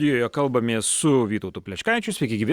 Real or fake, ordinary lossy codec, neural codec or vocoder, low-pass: real; Opus, 64 kbps; none; 19.8 kHz